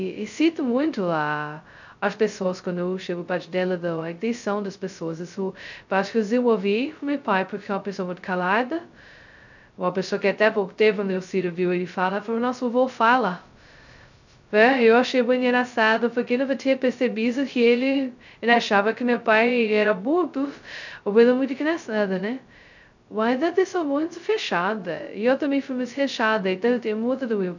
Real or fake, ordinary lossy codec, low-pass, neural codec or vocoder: fake; none; 7.2 kHz; codec, 16 kHz, 0.2 kbps, FocalCodec